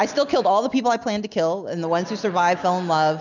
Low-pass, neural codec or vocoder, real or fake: 7.2 kHz; none; real